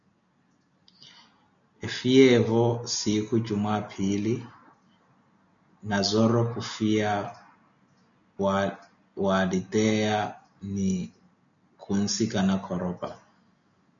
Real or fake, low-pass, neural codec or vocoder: real; 7.2 kHz; none